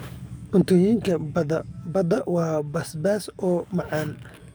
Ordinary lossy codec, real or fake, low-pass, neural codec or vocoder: none; fake; none; codec, 44.1 kHz, 7.8 kbps, DAC